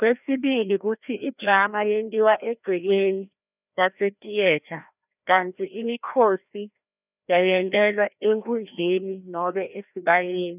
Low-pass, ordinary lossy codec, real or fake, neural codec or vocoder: 3.6 kHz; none; fake; codec, 16 kHz, 1 kbps, FreqCodec, larger model